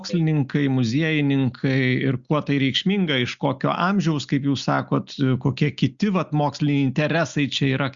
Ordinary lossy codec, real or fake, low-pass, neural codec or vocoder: Opus, 64 kbps; real; 7.2 kHz; none